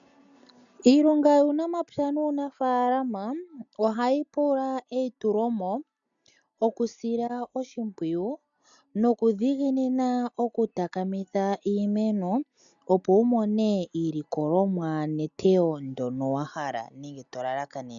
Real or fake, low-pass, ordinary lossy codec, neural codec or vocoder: real; 7.2 kHz; MP3, 96 kbps; none